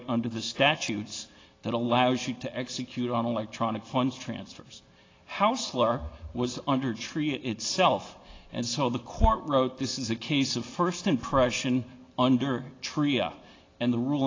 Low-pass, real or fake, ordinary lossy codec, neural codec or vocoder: 7.2 kHz; real; AAC, 32 kbps; none